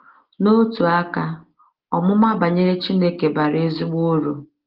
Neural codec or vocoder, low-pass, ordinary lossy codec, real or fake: none; 5.4 kHz; Opus, 16 kbps; real